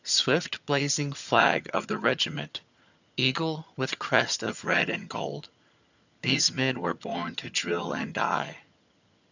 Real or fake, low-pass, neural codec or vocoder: fake; 7.2 kHz; vocoder, 22.05 kHz, 80 mel bands, HiFi-GAN